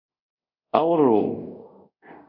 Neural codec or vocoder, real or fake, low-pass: codec, 24 kHz, 0.5 kbps, DualCodec; fake; 5.4 kHz